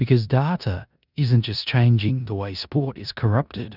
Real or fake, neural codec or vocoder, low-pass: fake; codec, 16 kHz in and 24 kHz out, 0.9 kbps, LongCat-Audio-Codec, four codebook decoder; 5.4 kHz